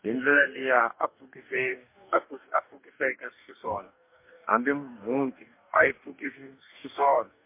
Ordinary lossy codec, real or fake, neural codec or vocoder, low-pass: MP3, 24 kbps; fake; codec, 44.1 kHz, 2.6 kbps, DAC; 3.6 kHz